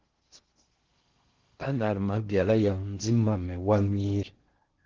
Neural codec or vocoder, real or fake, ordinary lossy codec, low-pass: codec, 16 kHz in and 24 kHz out, 0.6 kbps, FocalCodec, streaming, 2048 codes; fake; Opus, 16 kbps; 7.2 kHz